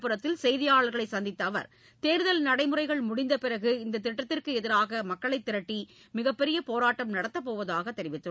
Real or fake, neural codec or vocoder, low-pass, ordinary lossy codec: real; none; none; none